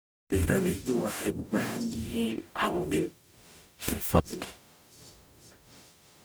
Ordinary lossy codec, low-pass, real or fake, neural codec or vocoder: none; none; fake; codec, 44.1 kHz, 0.9 kbps, DAC